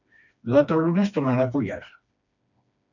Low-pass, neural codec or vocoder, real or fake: 7.2 kHz; codec, 16 kHz, 2 kbps, FreqCodec, smaller model; fake